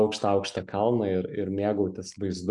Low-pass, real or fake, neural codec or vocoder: 10.8 kHz; real; none